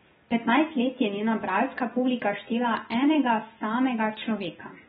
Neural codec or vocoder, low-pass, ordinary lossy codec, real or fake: none; 7.2 kHz; AAC, 16 kbps; real